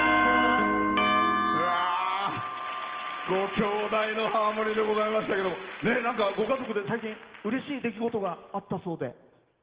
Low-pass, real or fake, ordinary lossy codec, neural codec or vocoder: 3.6 kHz; real; Opus, 16 kbps; none